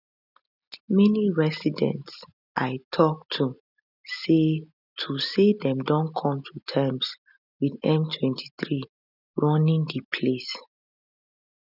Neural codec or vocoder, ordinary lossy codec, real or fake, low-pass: none; none; real; 5.4 kHz